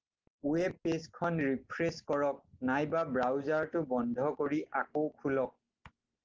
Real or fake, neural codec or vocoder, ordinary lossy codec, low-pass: real; none; Opus, 16 kbps; 7.2 kHz